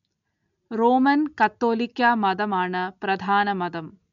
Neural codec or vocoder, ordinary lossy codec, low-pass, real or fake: none; none; 7.2 kHz; real